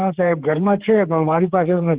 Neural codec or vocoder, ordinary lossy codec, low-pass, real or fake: codec, 16 kHz, 4 kbps, X-Codec, HuBERT features, trained on general audio; Opus, 16 kbps; 3.6 kHz; fake